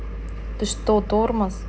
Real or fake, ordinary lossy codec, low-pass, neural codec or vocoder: real; none; none; none